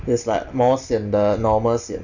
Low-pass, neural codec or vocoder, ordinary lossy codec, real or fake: 7.2 kHz; none; none; real